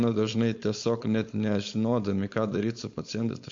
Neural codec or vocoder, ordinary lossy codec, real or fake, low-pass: codec, 16 kHz, 4.8 kbps, FACodec; MP3, 48 kbps; fake; 7.2 kHz